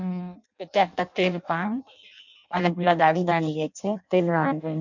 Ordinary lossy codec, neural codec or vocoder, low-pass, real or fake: none; codec, 16 kHz in and 24 kHz out, 0.6 kbps, FireRedTTS-2 codec; 7.2 kHz; fake